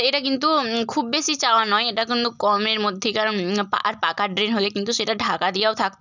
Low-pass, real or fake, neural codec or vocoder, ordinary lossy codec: 7.2 kHz; real; none; none